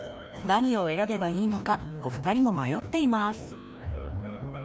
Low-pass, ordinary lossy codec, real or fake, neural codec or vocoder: none; none; fake; codec, 16 kHz, 1 kbps, FreqCodec, larger model